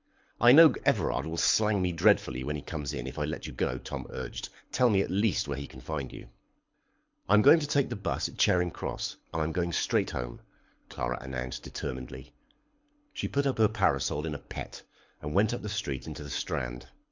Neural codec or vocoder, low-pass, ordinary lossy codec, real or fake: codec, 24 kHz, 6 kbps, HILCodec; 7.2 kHz; MP3, 64 kbps; fake